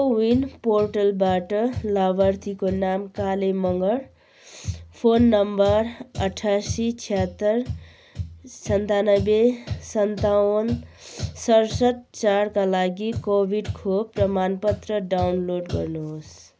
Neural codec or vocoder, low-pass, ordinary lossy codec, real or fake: none; none; none; real